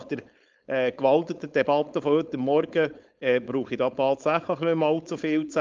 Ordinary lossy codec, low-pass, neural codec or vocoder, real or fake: Opus, 24 kbps; 7.2 kHz; codec, 16 kHz, 4.8 kbps, FACodec; fake